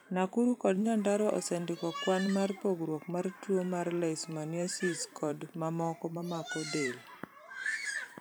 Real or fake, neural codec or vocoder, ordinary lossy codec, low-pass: fake; vocoder, 44.1 kHz, 128 mel bands every 512 samples, BigVGAN v2; none; none